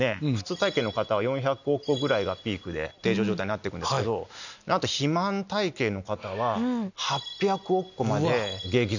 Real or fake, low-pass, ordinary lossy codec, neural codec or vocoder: real; 7.2 kHz; none; none